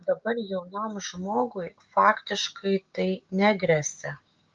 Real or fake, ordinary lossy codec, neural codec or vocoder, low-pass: fake; Opus, 24 kbps; codec, 16 kHz, 6 kbps, DAC; 7.2 kHz